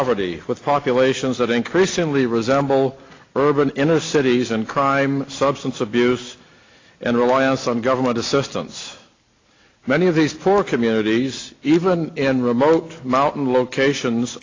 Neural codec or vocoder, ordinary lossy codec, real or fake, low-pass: none; AAC, 32 kbps; real; 7.2 kHz